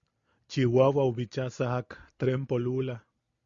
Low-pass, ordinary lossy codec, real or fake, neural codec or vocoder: 7.2 kHz; Opus, 64 kbps; real; none